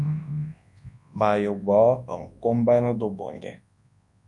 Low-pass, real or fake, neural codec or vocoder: 10.8 kHz; fake; codec, 24 kHz, 0.9 kbps, WavTokenizer, large speech release